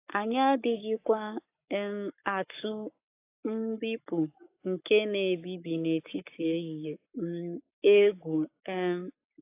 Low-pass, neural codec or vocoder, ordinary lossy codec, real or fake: 3.6 kHz; codec, 44.1 kHz, 7.8 kbps, Pupu-Codec; AAC, 24 kbps; fake